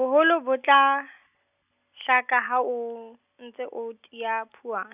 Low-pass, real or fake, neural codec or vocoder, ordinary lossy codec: 3.6 kHz; real; none; none